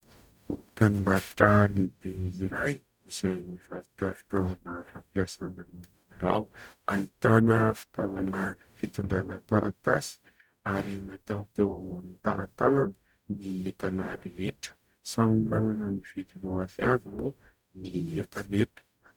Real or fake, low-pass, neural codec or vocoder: fake; 19.8 kHz; codec, 44.1 kHz, 0.9 kbps, DAC